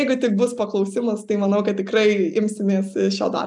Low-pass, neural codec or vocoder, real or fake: 10.8 kHz; none; real